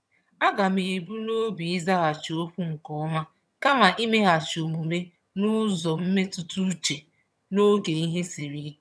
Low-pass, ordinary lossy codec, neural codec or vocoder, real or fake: none; none; vocoder, 22.05 kHz, 80 mel bands, HiFi-GAN; fake